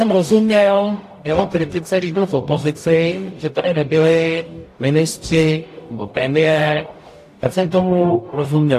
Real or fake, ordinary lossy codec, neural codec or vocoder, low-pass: fake; AAC, 64 kbps; codec, 44.1 kHz, 0.9 kbps, DAC; 14.4 kHz